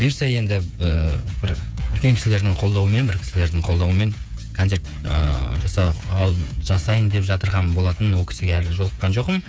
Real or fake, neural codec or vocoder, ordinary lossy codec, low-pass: fake; codec, 16 kHz, 8 kbps, FreqCodec, smaller model; none; none